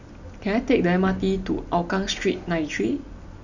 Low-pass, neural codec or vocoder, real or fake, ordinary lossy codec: 7.2 kHz; none; real; none